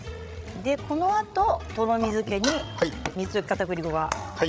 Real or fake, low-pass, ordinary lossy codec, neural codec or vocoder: fake; none; none; codec, 16 kHz, 16 kbps, FreqCodec, larger model